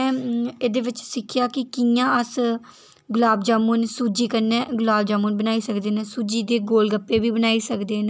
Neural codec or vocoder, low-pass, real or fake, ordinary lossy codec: none; none; real; none